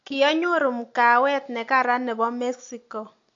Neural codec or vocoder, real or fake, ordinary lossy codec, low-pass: none; real; AAC, 48 kbps; 7.2 kHz